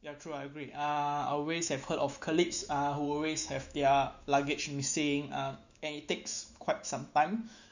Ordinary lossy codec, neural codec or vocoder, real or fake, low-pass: none; autoencoder, 48 kHz, 128 numbers a frame, DAC-VAE, trained on Japanese speech; fake; 7.2 kHz